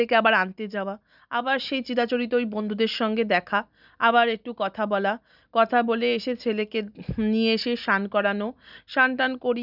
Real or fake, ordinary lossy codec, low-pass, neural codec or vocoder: real; none; 5.4 kHz; none